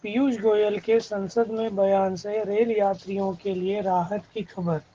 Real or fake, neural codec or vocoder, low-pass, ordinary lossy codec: real; none; 7.2 kHz; Opus, 16 kbps